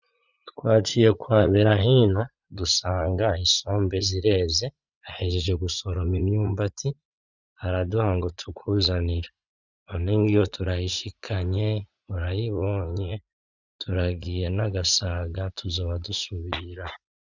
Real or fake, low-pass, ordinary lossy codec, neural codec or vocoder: fake; 7.2 kHz; Opus, 64 kbps; vocoder, 44.1 kHz, 80 mel bands, Vocos